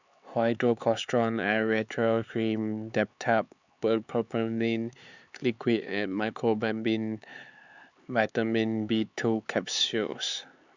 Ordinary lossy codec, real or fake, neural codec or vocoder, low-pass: Opus, 64 kbps; fake; codec, 16 kHz, 4 kbps, X-Codec, HuBERT features, trained on LibriSpeech; 7.2 kHz